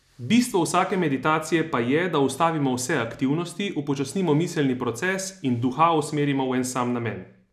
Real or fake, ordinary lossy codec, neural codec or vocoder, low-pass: real; none; none; 14.4 kHz